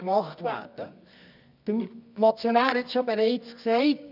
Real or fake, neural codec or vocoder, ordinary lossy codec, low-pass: fake; codec, 24 kHz, 0.9 kbps, WavTokenizer, medium music audio release; none; 5.4 kHz